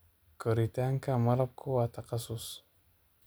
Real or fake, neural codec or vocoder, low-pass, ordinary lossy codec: real; none; none; none